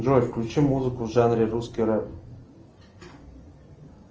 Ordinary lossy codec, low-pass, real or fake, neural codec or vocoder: Opus, 24 kbps; 7.2 kHz; real; none